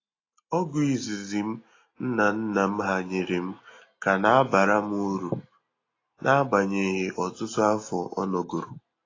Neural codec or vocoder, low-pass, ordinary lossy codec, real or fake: none; 7.2 kHz; AAC, 32 kbps; real